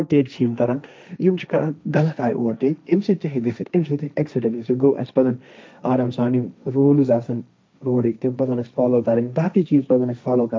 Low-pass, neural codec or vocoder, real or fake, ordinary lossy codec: none; codec, 16 kHz, 1.1 kbps, Voila-Tokenizer; fake; none